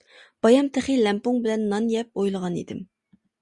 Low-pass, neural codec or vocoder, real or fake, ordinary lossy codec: 10.8 kHz; none; real; Opus, 64 kbps